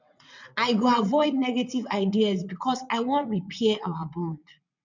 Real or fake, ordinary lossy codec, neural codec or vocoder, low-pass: fake; none; vocoder, 22.05 kHz, 80 mel bands, WaveNeXt; 7.2 kHz